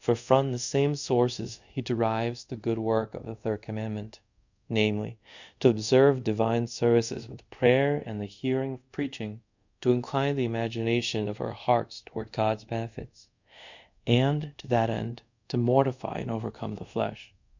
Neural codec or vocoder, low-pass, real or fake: codec, 24 kHz, 0.5 kbps, DualCodec; 7.2 kHz; fake